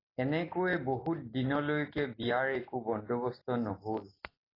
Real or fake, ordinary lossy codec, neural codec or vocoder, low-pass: real; AAC, 24 kbps; none; 5.4 kHz